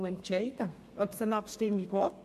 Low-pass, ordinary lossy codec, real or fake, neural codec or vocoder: 14.4 kHz; MP3, 64 kbps; fake; codec, 32 kHz, 1.9 kbps, SNAC